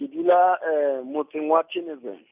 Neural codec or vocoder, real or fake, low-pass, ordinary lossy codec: none; real; 3.6 kHz; none